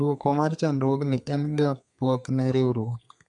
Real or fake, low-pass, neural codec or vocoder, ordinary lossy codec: fake; 10.8 kHz; codec, 32 kHz, 1.9 kbps, SNAC; none